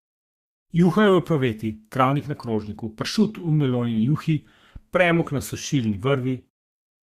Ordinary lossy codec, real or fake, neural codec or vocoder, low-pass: Opus, 64 kbps; fake; codec, 32 kHz, 1.9 kbps, SNAC; 14.4 kHz